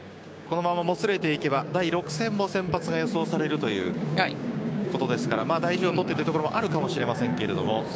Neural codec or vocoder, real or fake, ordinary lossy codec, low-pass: codec, 16 kHz, 6 kbps, DAC; fake; none; none